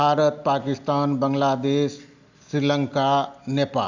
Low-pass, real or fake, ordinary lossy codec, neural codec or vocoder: 7.2 kHz; real; Opus, 64 kbps; none